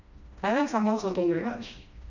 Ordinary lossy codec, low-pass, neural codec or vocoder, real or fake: none; 7.2 kHz; codec, 16 kHz, 1 kbps, FreqCodec, smaller model; fake